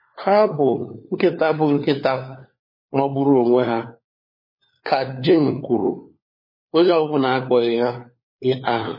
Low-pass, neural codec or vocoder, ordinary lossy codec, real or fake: 5.4 kHz; codec, 16 kHz, 4 kbps, FunCodec, trained on LibriTTS, 50 frames a second; MP3, 24 kbps; fake